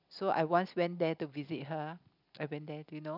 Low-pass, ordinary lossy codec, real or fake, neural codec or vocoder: 5.4 kHz; none; real; none